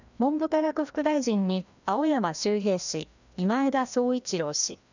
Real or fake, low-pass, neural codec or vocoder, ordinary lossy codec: fake; 7.2 kHz; codec, 16 kHz, 1 kbps, FreqCodec, larger model; none